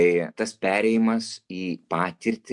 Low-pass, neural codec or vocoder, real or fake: 10.8 kHz; none; real